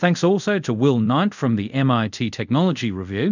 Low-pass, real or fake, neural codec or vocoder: 7.2 kHz; fake; codec, 24 kHz, 0.5 kbps, DualCodec